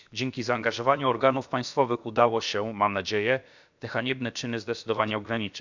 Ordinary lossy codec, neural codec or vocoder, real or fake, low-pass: none; codec, 16 kHz, about 1 kbps, DyCAST, with the encoder's durations; fake; 7.2 kHz